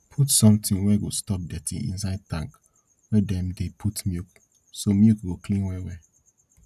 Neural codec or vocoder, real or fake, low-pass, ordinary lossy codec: none; real; 14.4 kHz; none